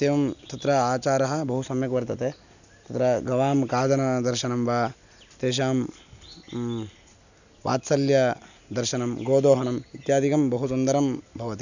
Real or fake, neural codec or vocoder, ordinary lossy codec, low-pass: real; none; none; 7.2 kHz